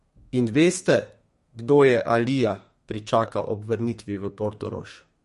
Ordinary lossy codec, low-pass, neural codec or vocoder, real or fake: MP3, 48 kbps; 14.4 kHz; codec, 32 kHz, 1.9 kbps, SNAC; fake